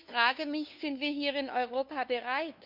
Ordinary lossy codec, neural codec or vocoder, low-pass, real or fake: none; codec, 16 kHz, 2 kbps, FunCodec, trained on LibriTTS, 25 frames a second; 5.4 kHz; fake